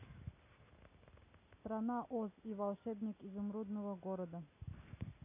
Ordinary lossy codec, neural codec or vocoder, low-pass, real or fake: none; none; 3.6 kHz; real